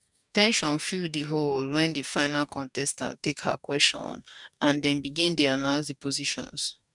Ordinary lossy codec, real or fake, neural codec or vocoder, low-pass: none; fake; codec, 44.1 kHz, 2.6 kbps, DAC; 10.8 kHz